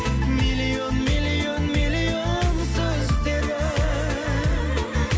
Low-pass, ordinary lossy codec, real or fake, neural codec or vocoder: none; none; real; none